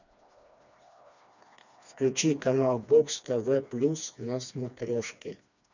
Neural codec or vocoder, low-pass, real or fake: codec, 16 kHz, 2 kbps, FreqCodec, smaller model; 7.2 kHz; fake